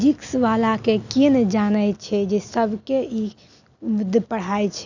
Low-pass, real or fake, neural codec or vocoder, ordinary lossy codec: 7.2 kHz; real; none; none